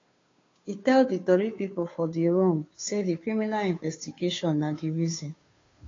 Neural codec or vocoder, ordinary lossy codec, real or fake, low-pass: codec, 16 kHz, 2 kbps, FunCodec, trained on Chinese and English, 25 frames a second; AAC, 32 kbps; fake; 7.2 kHz